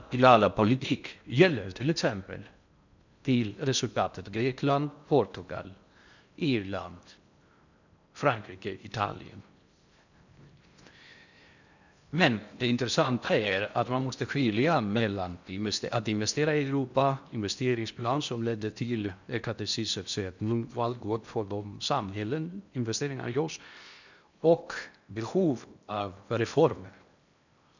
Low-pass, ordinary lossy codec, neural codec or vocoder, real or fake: 7.2 kHz; none; codec, 16 kHz in and 24 kHz out, 0.6 kbps, FocalCodec, streaming, 4096 codes; fake